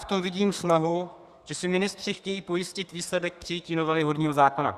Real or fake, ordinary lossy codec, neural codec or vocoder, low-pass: fake; Opus, 64 kbps; codec, 32 kHz, 1.9 kbps, SNAC; 14.4 kHz